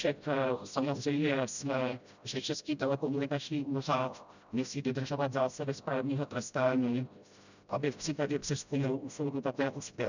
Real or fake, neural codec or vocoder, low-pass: fake; codec, 16 kHz, 0.5 kbps, FreqCodec, smaller model; 7.2 kHz